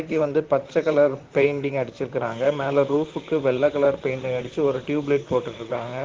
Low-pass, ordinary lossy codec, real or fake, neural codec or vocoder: 7.2 kHz; Opus, 16 kbps; fake; vocoder, 44.1 kHz, 128 mel bands, Pupu-Vocoder